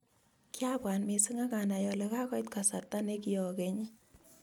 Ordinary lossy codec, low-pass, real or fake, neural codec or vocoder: none; none; real; none